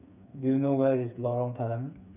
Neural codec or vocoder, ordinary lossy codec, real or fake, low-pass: codec, 16 kHz, 4 kbps, FreqCodec, smaller model; none; fake; 3.6 kHz